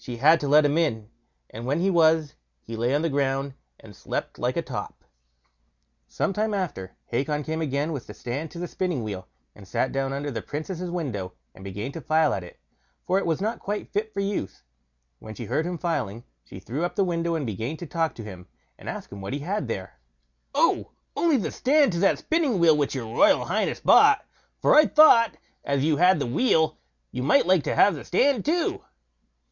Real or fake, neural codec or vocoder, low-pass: real; none; 7.2 kHz